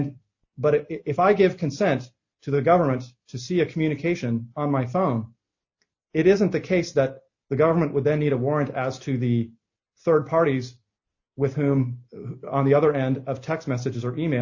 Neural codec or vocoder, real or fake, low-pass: none; real; 7.2 kHz